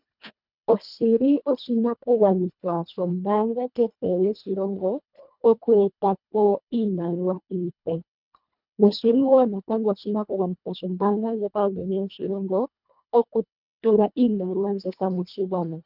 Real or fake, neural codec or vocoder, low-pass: fake; codec, 24 kHz, 1.5 kbps, HILCodec; 5.4 kHz